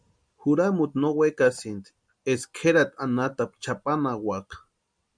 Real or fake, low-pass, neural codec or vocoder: real; 9.9 kHz; none